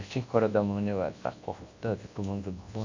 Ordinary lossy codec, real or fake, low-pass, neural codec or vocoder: AAC, 48 kbps; fake; 7.2 kHz; codec, 24 kHz, 0.9 kbps, WavTokenizer, large speech release